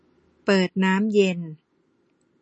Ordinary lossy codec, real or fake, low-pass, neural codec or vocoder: MP3, 32 kbps; real; 10.8 kHz; none